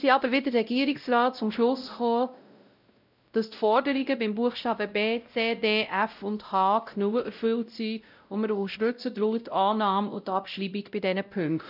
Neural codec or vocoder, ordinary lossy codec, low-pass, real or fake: codec, 16 kHz, 0.5 kbps, X-Codec, WavLM features, trained on Multilingual LibriSpeech; none; 5.4 kHz; fake